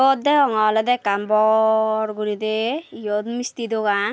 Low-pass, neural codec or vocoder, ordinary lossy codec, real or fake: none; none; none; real